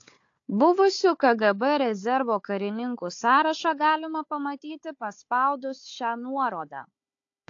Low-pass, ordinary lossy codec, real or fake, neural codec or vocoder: 7.2 kHz; AAC, 48 kbps; fake; codec, 16 kHz, 4 kbps, FunCodec, trained on Chinese and English, 50 frames a second